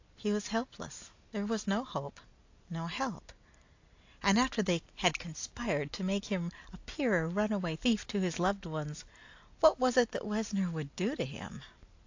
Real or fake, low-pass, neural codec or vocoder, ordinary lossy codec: real; 7.2 kHz; none; AAC, 48 kbps